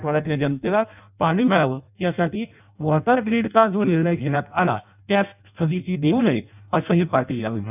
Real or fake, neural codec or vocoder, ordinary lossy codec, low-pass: fake; codec, 16 kHz in and 24 kHz out, 0.6 kbps, FireRedTTS-2 codec; none; 3.6 kHz